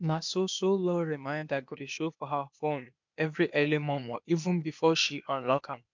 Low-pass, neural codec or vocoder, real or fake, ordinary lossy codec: 7.2 kHz; codec, 16 kHz, 0.8 kbps, ZipCodec; fake; MP3, 64 kbps